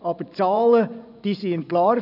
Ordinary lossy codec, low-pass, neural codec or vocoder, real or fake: none; 5.4 kHz; vocoder, 24 kHz, 100 mel bands, Vocos; fake